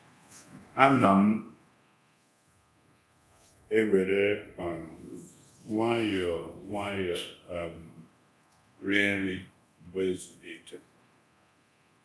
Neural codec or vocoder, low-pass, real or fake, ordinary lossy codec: codec, 24 kHz, 0.9 kbps, DualCodec; none; fake; none